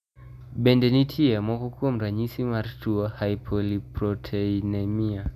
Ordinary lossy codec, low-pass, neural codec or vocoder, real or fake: none; 14.4 kHz; none; real